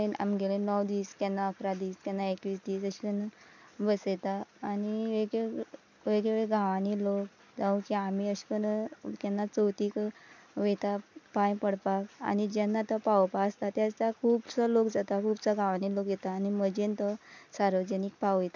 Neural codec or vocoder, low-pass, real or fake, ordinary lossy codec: none; 7.2 kHz; real; none